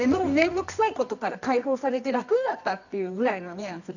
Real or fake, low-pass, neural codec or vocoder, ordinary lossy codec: fake; 7.2 kHz; codec, 24 kHz, 0.9 kbps, WavTokenizer, medium music audio release; none